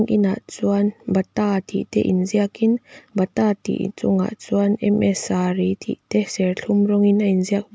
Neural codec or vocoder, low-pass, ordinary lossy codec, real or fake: none; none; none; real